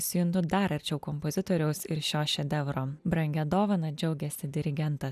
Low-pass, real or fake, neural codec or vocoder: 14.4 kHz; real; none